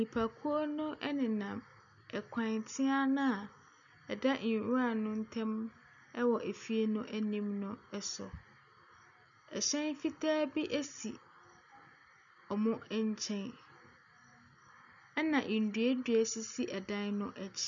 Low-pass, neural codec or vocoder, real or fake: 7.2 kHz; none; real